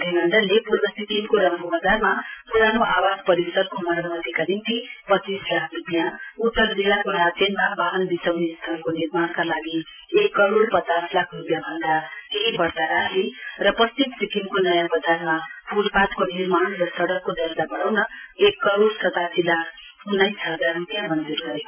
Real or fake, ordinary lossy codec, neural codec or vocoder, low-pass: fake; none; vocoder, 44.1 kHz, 128 mel bands every 512 samples, BigVGAN v2; 3.6 kHz